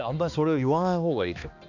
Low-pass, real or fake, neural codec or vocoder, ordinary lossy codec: 7.2 kHz; fake; codec, 16 kHz, 2 kbps, X-Codec, HuBERT features, trained on balanced general audio; none